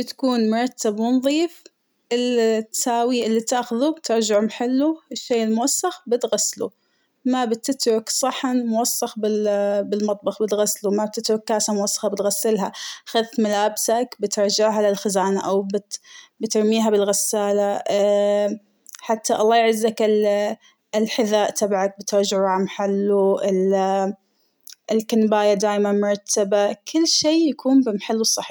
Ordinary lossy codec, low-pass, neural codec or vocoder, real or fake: none; none; none; real